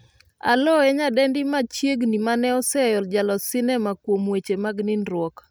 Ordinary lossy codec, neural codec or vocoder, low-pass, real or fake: none; none; none; real